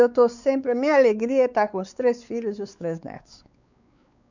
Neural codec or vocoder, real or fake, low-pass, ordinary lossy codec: codec, 16 kHz, 4 kbps, X-Codec, WavLM features, trained on Multilingual LibriSpeech; fake; 7.2 kHz; none